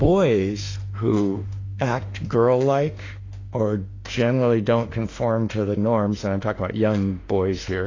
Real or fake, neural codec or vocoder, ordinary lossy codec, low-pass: fake; autoencoder, 48 kHz, 32 numbers a frame, DAC-VAE, trained on Japanese speech; AAC, 32 kbps; 7.2 kHz